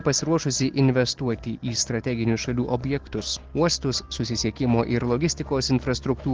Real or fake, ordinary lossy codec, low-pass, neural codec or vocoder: real; Opus, 16 kbps; 7.2 kHz; none